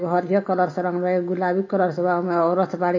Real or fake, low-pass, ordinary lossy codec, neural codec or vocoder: real; 7.2 kHz; MP3, 32 kbps; none